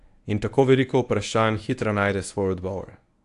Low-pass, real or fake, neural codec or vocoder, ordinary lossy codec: 10.8 kHz; fake; codec, 24 kHz, 0.9 kbps, WavTokenizer, medium speech release version 1; AAC, 64 kbps